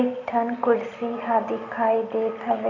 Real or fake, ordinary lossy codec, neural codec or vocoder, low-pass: real; none; none; 7.2 kHz